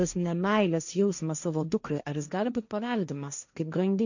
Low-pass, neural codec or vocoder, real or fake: 7.2 kHz; codec, 16 kHz, 1.1 kbps, Voila-Tokenizer; fake